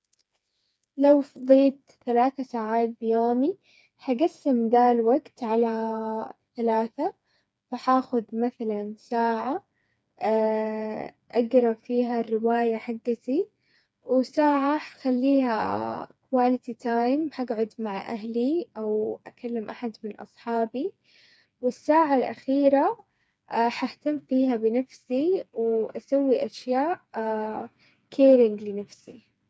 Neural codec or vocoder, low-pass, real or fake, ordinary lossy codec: codec, 16 kHz, 4 kbps, FreqCodec, smaller model; none; fake; none